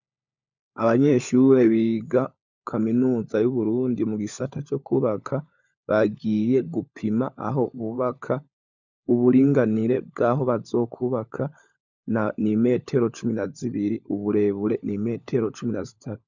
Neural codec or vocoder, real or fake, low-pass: codec, 16 kHz, 16 kbps, FunCodec, trained on LibriTTS, 50 frames a second; fake; 7.2 kHz